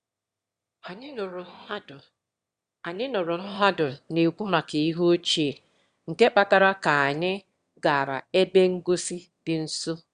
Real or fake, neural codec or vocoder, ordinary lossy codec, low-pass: fake; autoencoder, 22.05 kHz, a latent of 192 numbers a frame, VITS, trained on one speaker; Opus, 64 kbps; 9.9 kHz